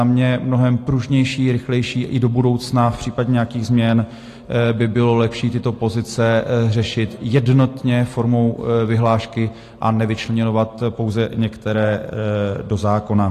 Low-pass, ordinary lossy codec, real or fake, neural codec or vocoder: 14.4 kHz; AAC, 48 kbps; real; none